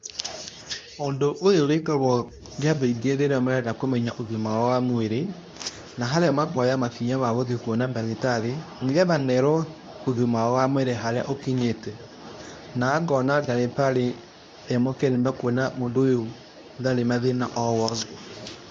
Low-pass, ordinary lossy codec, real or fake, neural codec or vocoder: 10.8 kHz; none; fake; codec, 24 kHz, 0.9 kbps, WavTokenizer, medium speech release version 2